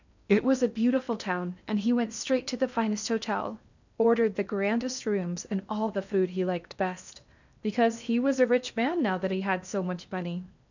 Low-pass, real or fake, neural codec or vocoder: 7.2 kHz; fake; codec, 16 kHz in and 24 kHz out, 0.8 kbps, FocalCodec, streaming, 65536 codes